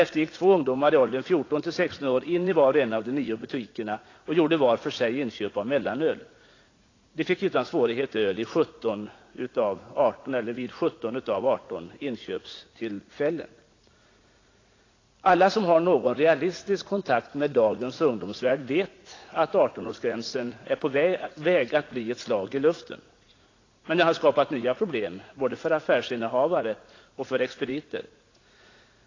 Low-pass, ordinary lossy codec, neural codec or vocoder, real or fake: 7.2 kHz; AAC, 32 kbps; vocoder, 44.1 kHz, 80 mel bands, Vocos; fake